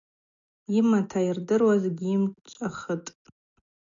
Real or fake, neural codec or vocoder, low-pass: real; none; 7.2 kHz